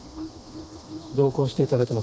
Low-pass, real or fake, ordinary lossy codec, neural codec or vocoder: none; fake; none; codec, 16 kHz, 2 kbps, FreqCodec, smaller model